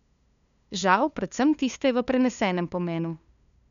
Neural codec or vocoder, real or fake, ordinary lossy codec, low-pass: codec, 16 kHz, 2 kbps, FunCodec, trained on LibriTTS, 25 frames a second; fake; none; 7.2 kHz